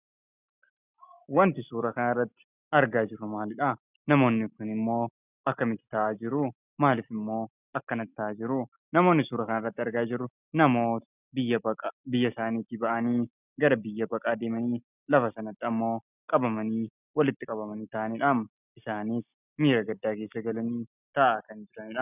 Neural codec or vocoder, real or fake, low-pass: none; real; 3.6 kHz